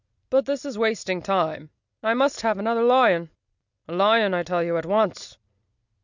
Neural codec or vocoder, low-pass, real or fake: none; 7.2 kHz; real